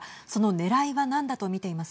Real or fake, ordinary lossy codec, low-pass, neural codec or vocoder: real; none; none; none